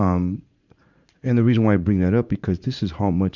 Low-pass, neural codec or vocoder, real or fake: 7.2 kHz; vocoder, 44.1 kHz, 80 mel bands, Vocos; fake